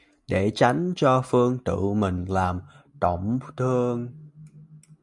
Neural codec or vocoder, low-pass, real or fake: none; 10.8 kHz; real